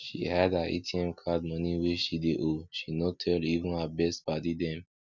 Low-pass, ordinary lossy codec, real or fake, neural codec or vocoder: 7.2 kHz; none; real; none